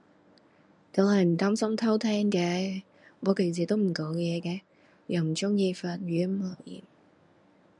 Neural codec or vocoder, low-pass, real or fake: codec, 24 kHz, 0.9 kbps, WavTokenizer, medium speech release version 1; 10.8 kHz; fake